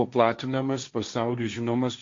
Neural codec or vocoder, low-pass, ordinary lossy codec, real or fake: codec, 16 kHz, 1.1 kbps, Voila-Tokenizer; 7.2 kHz; MP3, 48 kbps; fake